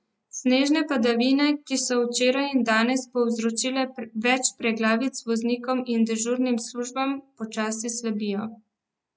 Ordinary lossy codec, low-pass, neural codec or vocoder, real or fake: none; none; none; real